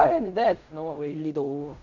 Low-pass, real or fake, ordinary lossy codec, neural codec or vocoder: 7.2 kHz; fake; none; codec, 16 kHz in and 24 kHz out, 0.4 kbps, LongCat-Audio-Codec, fine tuned four codebook decoder